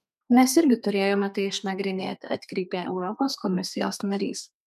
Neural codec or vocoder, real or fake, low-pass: codec, 32 kHz, 1.9 kbps, SNAC; fake; 14.4 kHz